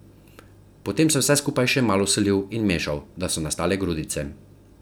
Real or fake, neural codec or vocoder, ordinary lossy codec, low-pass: real; none; none; none